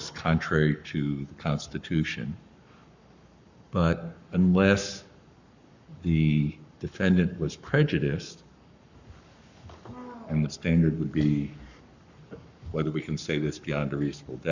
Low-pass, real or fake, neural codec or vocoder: 7.2 kHz; fake; codec, 44.1 kHz, 7.8 kbps, Pupu-Codec